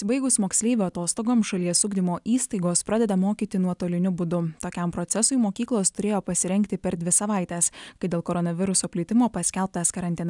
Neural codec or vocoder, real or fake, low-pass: none; real; 10.8 kHz